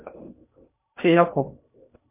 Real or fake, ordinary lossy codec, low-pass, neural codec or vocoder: fake; MP3, 24 kbps; 3.6 kHz; codec, 16 kHz in and 24 kHz out, 0.6 kbps, FocalCodec, streaming, 2048 codes